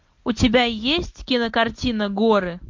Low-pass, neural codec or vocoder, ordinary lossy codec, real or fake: 7.2 kHz; none; MP3, 48 kbps; real